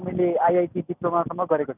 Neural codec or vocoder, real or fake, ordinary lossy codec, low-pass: none; real; none; 3.6 kHz